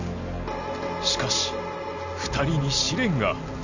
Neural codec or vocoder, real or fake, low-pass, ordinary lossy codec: none; real; 7.2 kHz; none